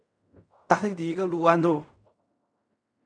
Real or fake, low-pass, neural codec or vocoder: fake; 9.9 kHz; codec, 16 kHz in and 24 kHz out, 0.4 kbps, LongCat-Audio-Codec, fine tuned four codebook decoder